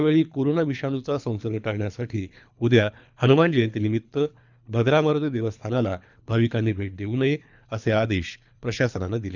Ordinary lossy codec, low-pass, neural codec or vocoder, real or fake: none; 7.2 kHz; codec, 24 kHz, 3 kbps, HILCodec; fake